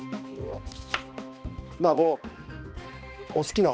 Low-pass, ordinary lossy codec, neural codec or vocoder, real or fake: none; none; codec, 16 kHz, 2 kbps, X-Codec, HuBERT features, trained on balanced general audio; fake